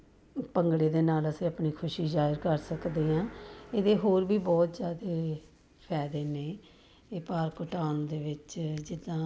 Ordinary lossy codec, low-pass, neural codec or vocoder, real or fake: none; none; none; real